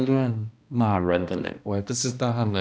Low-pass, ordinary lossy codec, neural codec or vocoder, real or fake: none; none; codec, 16 kHz, 1 kbps, X-Codec, HuBERT features, trained on balanced general audio; fake